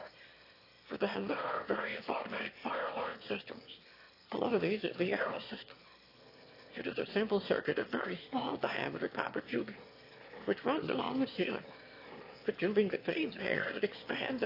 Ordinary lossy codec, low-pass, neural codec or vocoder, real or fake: AAC, 32 kbps; 5.4 kHz; autoencoder, 22.05 kHz, a latent of 192 numbers a frame, VITS, trained on one speaker; fake